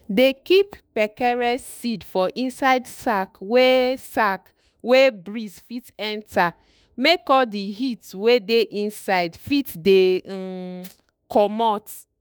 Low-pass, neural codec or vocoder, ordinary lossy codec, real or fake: none; autoencoder, 48 kHz, 32 numbers a frame, DAC-VAE, trained on Japanese speech; none; fake